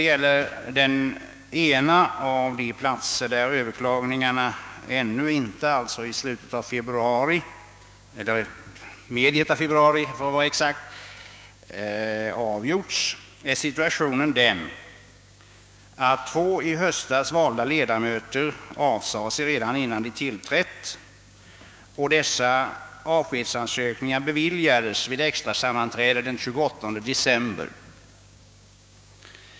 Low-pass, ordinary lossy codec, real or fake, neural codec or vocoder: none; none; fake; codec, 16 kHz, 6 kbps, DAC